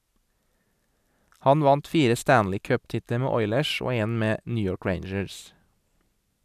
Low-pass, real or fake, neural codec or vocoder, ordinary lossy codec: 14.4 kHz; real; none; none